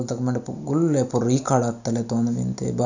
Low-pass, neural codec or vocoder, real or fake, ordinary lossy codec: 7.2 kHz; none; real; none